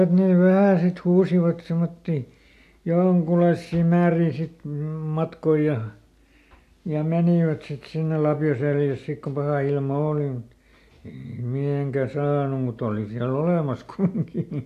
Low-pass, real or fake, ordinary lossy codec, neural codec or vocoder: 14.4 kHz; real; AAC, 64 kbps; none